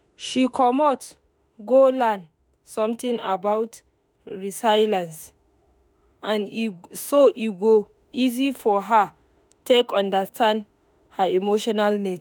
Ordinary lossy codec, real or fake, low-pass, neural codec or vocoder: none; fake; none; autoencoder, 48 kHz, 32 numbers a frame, DAC-VAE, trained on Japanese speech